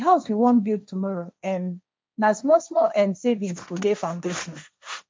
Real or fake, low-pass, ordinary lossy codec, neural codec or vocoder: fake; none; none; codec, 16 kHz, 1.1 kbps, Voila-Tokenizer